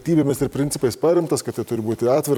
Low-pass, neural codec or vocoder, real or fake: 19.8 kHz; vocoder, 44.1 kHz, 128 mel bands every 256 samples, BigVGAN v2; fake